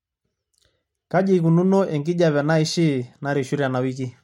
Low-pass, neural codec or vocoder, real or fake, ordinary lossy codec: 19.8 kHz; none; real; MP3, 64 kbps